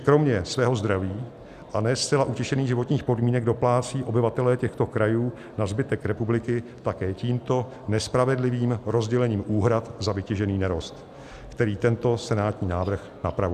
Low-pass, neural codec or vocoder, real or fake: 14.4 kHz; none; real